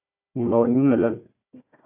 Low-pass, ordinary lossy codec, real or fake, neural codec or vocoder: 3.6 kHz; AAC, 32 kbps; fake; codec, 16 kHz, 1 kbps, FunCodec, trained on Chinese and English, 50 frames a second